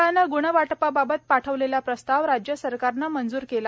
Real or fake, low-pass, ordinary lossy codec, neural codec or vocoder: real; none; none; none